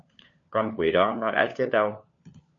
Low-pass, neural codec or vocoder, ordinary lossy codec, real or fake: 7.2 kHz; codec, 16 kHz, 4 kbps, FunCodec, trained on LibriTTS, 50 frames a second; MP3, 48 kbps; fake